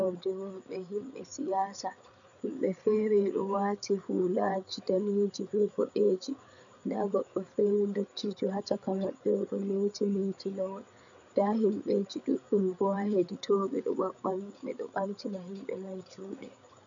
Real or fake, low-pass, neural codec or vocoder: fake; 7.2 kHz; codec, 16 kHz, 8 kbps, FreqCodec, larger model